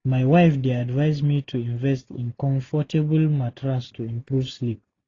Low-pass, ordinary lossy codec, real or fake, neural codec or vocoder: 7.2 kHz; AAC, 32 kbps; real; none